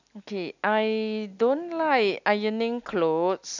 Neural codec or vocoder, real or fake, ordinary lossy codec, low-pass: none; real; none; 7.2 kHz